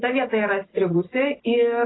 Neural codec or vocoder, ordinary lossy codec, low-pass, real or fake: none; AAC, 16 kbps; 7.2 kHz; real